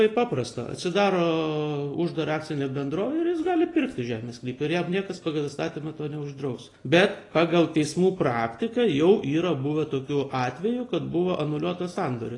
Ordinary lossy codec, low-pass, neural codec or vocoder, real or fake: AAC, 32 kbps; 10.8 kHz; none; real